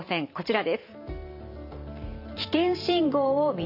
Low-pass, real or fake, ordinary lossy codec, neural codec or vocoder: 5.4 kHz; fake; none; vocoder, 44.1 kHz, 128 mel bands every 512 samples, BigVGAN v2